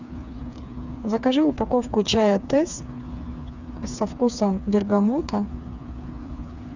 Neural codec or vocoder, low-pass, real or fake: codec, 16 kHz, 4 kbps, FreqCodec, smaller model; 7.2 kHz; fake